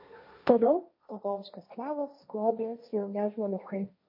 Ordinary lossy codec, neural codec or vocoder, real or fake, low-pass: MP3, 32 kbps; codec, 16 kHz, 1.1 kbps, Voila-Tokenizer; fake; 5.4 kHz